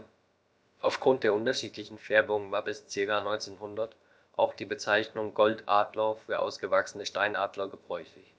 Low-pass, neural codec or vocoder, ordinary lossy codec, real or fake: none; codec, 16 kHz, about 1 kbps, DyCAST, with the encoder's durations; none; fake